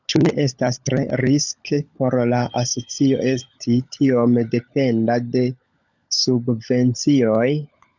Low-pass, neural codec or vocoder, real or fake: 7.2 kHz; codec, 16 kHz, 16 kbps, FunCodec, trained on LibriTTS, 50 frames a second; fake